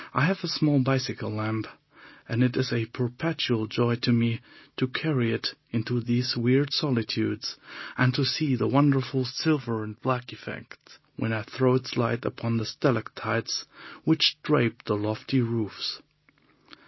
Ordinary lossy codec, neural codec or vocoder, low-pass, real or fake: MP3, 24 kbps; none; 7.2 kHz; real